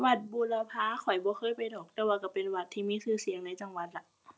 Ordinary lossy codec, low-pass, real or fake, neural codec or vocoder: none; none; real; none